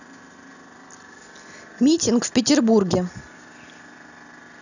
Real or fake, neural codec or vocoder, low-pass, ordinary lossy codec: real; none; 7.2 kHz; none